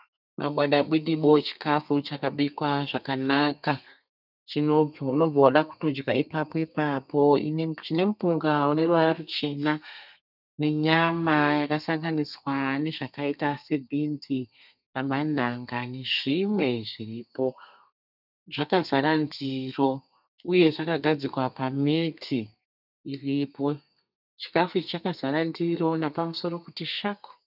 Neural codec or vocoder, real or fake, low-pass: codec, 32 kHz, 1.9 kbps, SNAC; fake; 5.4 kHz